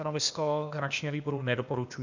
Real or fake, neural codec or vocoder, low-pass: fake; codec, 16 kHz, 0.8 kbps, ZipCodec; 7.2 kHz